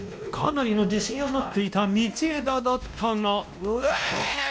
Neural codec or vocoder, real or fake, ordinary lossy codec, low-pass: codec, 16 kHz, 1 kbps, X-Codec, WavLM features, trained on Multilingual LibriSpeech; fake; none; none